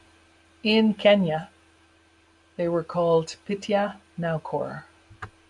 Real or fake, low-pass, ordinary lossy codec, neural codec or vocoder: real; 10.8 kHz; MP3, 64 kbps; none